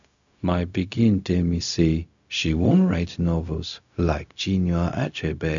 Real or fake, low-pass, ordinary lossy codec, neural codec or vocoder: fake; 7.2 kHz; none; codec, 16 kHz, 0.4 kbps, LongCat-Audio-Codec